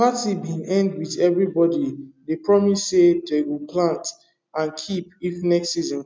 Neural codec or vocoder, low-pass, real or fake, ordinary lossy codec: none; none; real; none